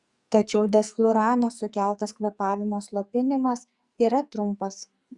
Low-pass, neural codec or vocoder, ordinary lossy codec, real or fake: 10.8 kHz; codec, 44.1 kHz, 2.6 kbps, SNAC; Opus, 64 kbps; fake